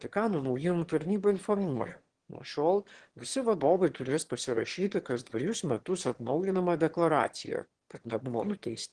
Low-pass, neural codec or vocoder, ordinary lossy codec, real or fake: 9.9 kHz; autoencoder, 22.05 kHz, a latent of 192 numbers a frame, VITS, trained on one speaker; Opus, 16 kbps; fake